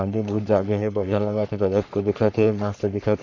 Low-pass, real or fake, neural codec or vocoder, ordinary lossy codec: 7.2 kHz; fake; codec, 44.1 kHz, 3.4 kbps, Pupu-Codec; none